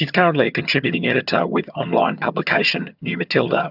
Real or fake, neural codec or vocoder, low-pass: fake; vocoder, 22.05 kHz, 80 mel bands, HiFi-GAN; 5.4 kHz